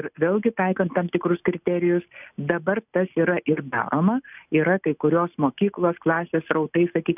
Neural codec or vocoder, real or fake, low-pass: none; real; 3.6 kHz